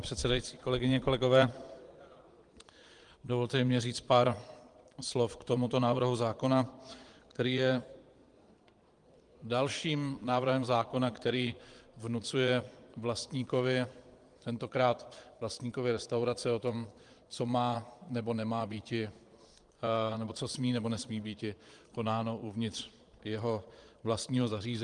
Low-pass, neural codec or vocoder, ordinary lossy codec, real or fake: 9.9 kHz; vocoder, 22.05 kHz, 80 mel bands, Vocos; Opus, 24 kbps; fake